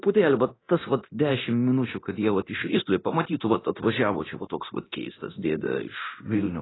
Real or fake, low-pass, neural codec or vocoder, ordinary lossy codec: fake; 7.2 kHz; codec, 24 kHz, 0.9 kbps, DualCodec; AAC, 16 kbps